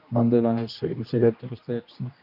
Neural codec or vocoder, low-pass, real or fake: codec, 16 kHz, 1 kbps, X-Codec, HuBERT features, trained on general audio; 5.4 kHz; fake